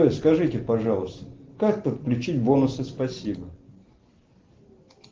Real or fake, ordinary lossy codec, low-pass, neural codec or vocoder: real; Opus, 16 kbps; 7.2 kHz; none